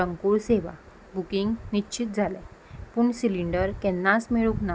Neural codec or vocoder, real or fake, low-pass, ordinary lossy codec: none; real; none; none